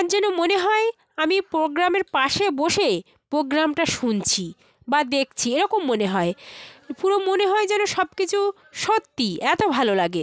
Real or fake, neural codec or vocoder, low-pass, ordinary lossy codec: real; none; none; none